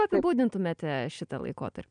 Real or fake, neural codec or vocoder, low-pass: real; none; 9.9 kHz